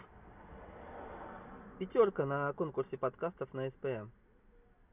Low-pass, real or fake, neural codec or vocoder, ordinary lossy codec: 3.6 kHz; real; none; none